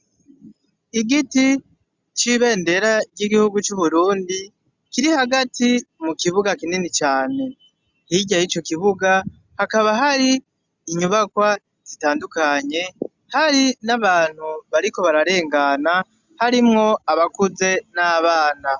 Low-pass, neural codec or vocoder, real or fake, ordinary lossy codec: 7.2 kHz; none; real; Opus, 64 kbps